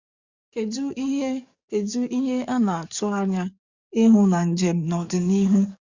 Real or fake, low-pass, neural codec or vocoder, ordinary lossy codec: fake; 7.2 kHz; codec, 44.1 kHz, 7.8 kbps, DAC; Opus, 64 kbps